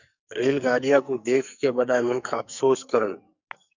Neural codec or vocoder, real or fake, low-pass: codec, 44.1 kHz, 2.6 kbps, SNAC; fake; 7.2 kHz